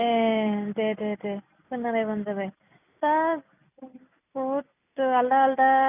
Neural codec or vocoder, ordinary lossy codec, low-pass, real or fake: none; none; 3.6 kHz; real